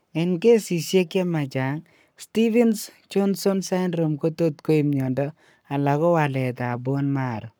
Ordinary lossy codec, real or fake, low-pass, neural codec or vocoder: none; fake; none; codec, 44.1 kHz, 7.8 kbps, Pupu-Codec